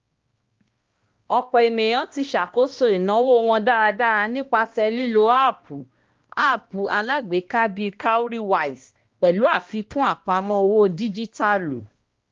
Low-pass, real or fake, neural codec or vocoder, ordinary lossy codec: 7.2 kHz; fake; codec, 16 kHz, 1 kbps, X-Codec, HuBERT features, trained on balanced general audio; Opus, 32 kbps